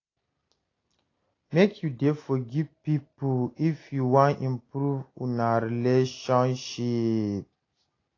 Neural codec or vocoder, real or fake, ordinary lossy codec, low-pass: none; real; AAC, 32 kbps; 7.2 kHz